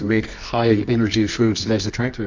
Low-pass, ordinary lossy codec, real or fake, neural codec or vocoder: 7.2 kHz; MP3, 64 kbps; fake; codec, 24 kHz, 0.9 kbps, WavTokenizer, medium music audio release